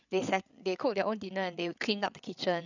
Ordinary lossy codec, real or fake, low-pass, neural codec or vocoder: none; fake; 7.2 kHz; codec, 16 kHz, 8 kbps, FreqCodec, larger model